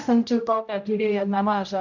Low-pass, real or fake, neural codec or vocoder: 7.2 kHz; fake; codec, 16 kHz, 0.5 kbps, X-Codec, HuBERT features, trained on general audio